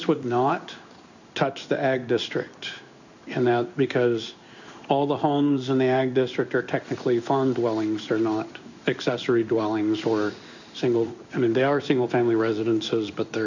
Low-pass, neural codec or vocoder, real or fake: 7.2 kHz; codec, 16 kHz in and 24 kHz out, 1 kbps, XY-Tokenizer; fake